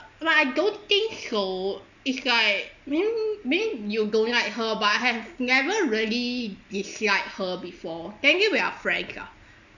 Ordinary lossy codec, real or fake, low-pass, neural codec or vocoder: none; real; 7.2 kHz; none